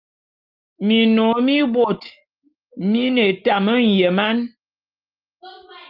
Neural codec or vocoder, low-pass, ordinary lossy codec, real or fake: none; 5.4 kHz; Opus, 32 kbps; real